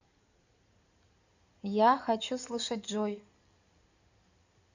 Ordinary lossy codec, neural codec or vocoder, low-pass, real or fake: none; codec, 16 kHz in and 24 kHz out, 2.2 kbps, FireRedTTS-2 codec; 7.2 kHz; fake